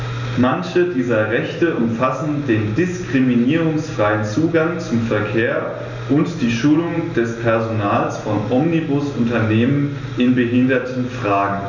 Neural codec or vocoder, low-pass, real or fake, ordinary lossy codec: none; 7.2 kHz; real; none